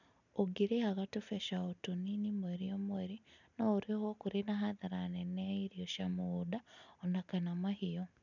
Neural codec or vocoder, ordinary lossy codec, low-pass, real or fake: none; none; 7.2 kHz; real